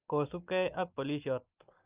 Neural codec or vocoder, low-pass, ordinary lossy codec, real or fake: none; 3.6 kHz; Opus, 24 kbps; real